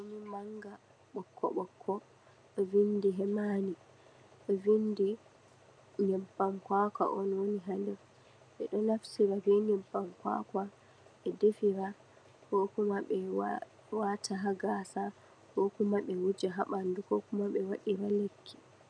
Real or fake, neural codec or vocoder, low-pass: real; none; 9.9 kHz